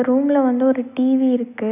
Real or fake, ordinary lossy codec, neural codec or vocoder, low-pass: real; none; none; 3.6 kHz